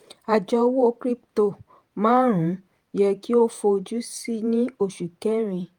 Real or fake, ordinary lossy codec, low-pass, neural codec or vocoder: fake; Opus, 32 kbps; 19.8 kHz; vocoder, 48 kHz, 128 mel bands, Vocos